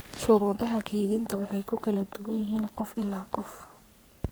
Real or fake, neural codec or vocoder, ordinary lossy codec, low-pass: fake; codec, 44.1 kHz, 3.4 kbps, Pupu-Codec; none; none